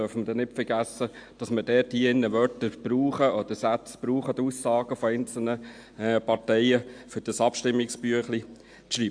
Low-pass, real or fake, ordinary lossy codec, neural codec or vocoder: 9.9 kHz; real; AAC, 64 kbps; none